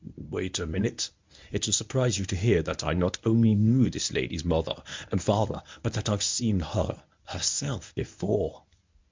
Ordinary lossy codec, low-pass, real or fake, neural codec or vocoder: MP3, 64 kbps; 7.2 kHz; fake; codec, 24 kHz, 0.9 kbps, WavTokenizer, medium speech release version 1